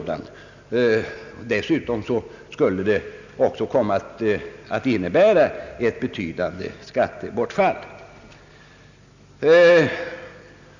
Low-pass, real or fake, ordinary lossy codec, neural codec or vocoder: 7.2 kHz; real; none; none